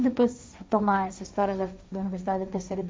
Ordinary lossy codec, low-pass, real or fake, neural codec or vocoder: none; none; fake; codec, 16 kHz, 1.1 kbps, Voila-Tokenizer